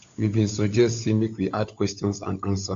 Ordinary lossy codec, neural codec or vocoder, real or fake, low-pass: AAC, 48 kbps; codec, 16 kHz, 16 kbps, FunCodec, trained on LibriTTS, 50 frames a second; fake; 7.2 kHz